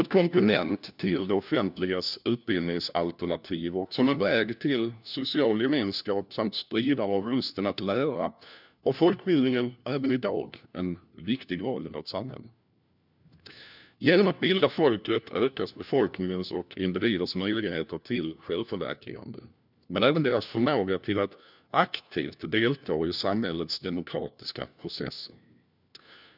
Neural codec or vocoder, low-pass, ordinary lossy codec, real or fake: codec, 16 kHz, 1 kbps, FunCodec, trained on LibriTTS, 50 frames a second; 5.4 kHz; none; fake